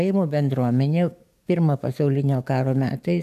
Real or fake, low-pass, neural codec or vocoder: fake; 14.4 kHz; codec, 44.1 kHz, 7.8 kbps, DAC